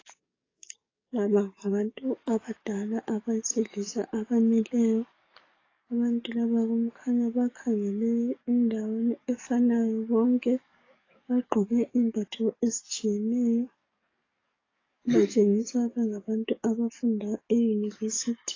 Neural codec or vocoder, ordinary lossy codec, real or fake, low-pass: codec, 44.1 kHz, 7.8 kbps, DAC; AAC, 32 kbps; fake; 7.2 kHz